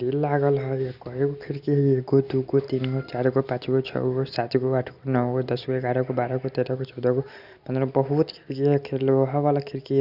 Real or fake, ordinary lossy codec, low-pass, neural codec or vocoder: real; Opus, 64 kbps; 5.4 kHz; none